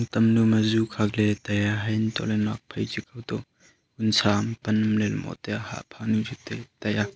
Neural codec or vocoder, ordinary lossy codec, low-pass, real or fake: none; none; none; real